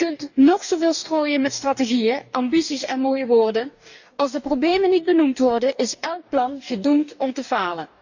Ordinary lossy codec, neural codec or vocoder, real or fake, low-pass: none; codec, 44.1 kHz, 2.6 kbps, DAC; fake; 7.2 kHz